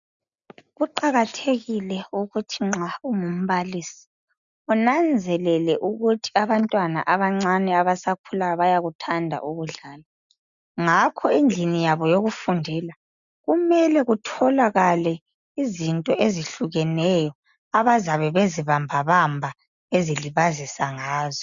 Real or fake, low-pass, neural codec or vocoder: real; 7.2 kHz; none